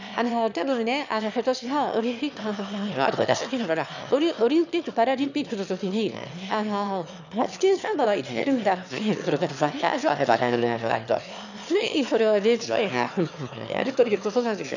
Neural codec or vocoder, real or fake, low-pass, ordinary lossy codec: autoencoder, 22.05 kHz, a latent of 192 numbers a frame, VITS, trained on one speaker; fake; 7.2 kHz; none